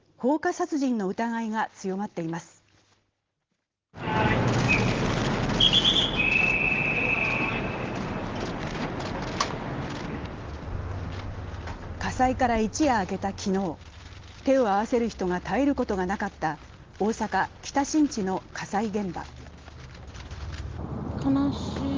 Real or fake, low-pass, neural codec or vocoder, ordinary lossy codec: real; 7.2 kHz; none; Opus, 16 kbps